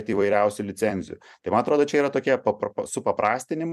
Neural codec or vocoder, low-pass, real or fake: vocoder, 44.1 kHz, 128 mel bands every 256 samples, BigVGAN v2; 14.4 kHz; fake